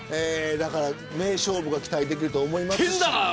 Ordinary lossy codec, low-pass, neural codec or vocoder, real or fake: none; none; none; real